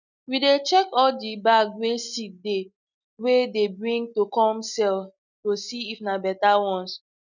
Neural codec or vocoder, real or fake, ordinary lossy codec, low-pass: none; real; none; 7.2 kHz